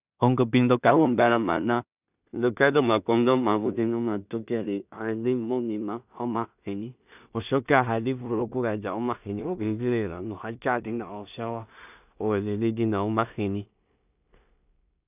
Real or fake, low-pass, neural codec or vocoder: fake; 3.6 kHz; codec, 16 kHz in and 24 kHz out, 0.4 kbps, LongCat-Audio-Codec, two codebook decoder